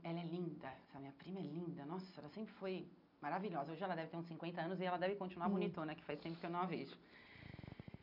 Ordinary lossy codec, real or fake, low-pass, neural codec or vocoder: none; real; 5.4 kHz; none